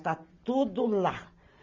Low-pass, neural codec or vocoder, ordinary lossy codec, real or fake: 7.2 kHz; none; none; real